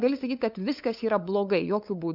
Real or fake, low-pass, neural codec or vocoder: fake; 5.4 kHz; codec, 16 kHz, 8 kbps, FunCodec, trained on LibriTTS, 25 frames a second